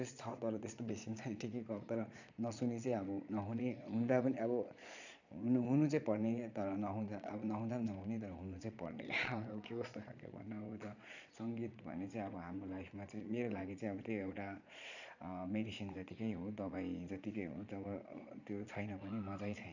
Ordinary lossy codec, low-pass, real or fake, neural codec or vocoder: none; 7.2 kHz; fake; vocoder, 22.05 kHz, 80 mel bands, Vocos